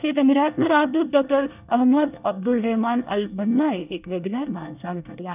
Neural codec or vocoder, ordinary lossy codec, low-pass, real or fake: codec, 24 kHz, 1 kbps, SNAC; none; 3.6 kHz; fake